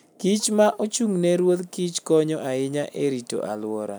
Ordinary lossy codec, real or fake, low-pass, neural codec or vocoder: none; real; none; none